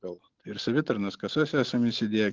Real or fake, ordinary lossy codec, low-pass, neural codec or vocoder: real; Opus, 32 kbps; 7.2 kHz; none